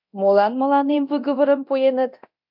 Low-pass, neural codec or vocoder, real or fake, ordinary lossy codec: 5.4 kHz; codec, 24 kHz, 0.9 kbps, DualCodec; fake; MP3, 48 kbps